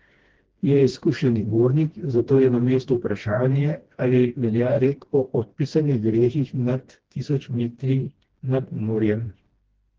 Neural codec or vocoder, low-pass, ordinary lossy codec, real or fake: codec, 16 kHz, 1 kbps, FreqCodec, smaller model; 7.2 kHz; Opus, 16 kbps; fake